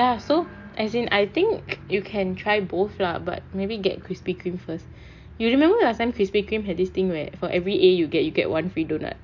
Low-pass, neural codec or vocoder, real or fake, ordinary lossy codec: 7.2 kHz; none; real; MP3, 48 kbps